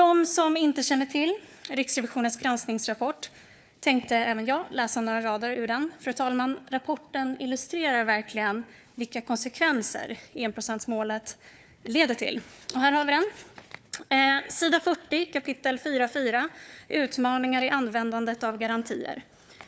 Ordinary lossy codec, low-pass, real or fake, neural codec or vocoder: none; none; fake; codec, 16 kHz, 4 kbps, FunCodec, trained on Chinese and English, 50 frames a second